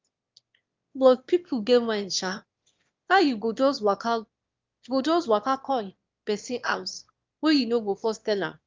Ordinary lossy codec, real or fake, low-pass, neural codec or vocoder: Opus, 24 kbps; fake; 7.2 kHz; autoencoder, 22.05 kHz, a latent of 192 numbers a frame, VITS, trained on one speaker